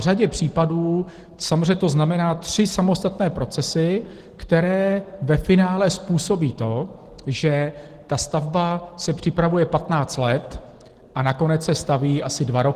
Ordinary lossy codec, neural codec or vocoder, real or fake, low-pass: Opus, 16 kbps; none; real; 14.4 kHz